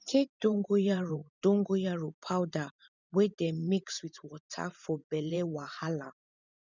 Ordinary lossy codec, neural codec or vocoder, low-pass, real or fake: none; vocoder, 44.1 kHz, 128 mel bands every 512 samples, BigVGAN v2; 7.2 kHz; fake